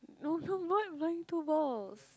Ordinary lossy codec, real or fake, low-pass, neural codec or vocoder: none; real; none; none